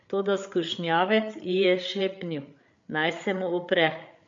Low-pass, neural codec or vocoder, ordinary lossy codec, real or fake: 7.2 kHz; codec, 16 kHz, 8 kbps, FreqCodec, larger model; MP3, 48 kbps; fake